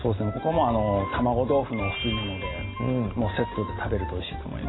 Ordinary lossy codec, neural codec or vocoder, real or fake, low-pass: AAC, 16 kbps; none; real; 7.2 kHz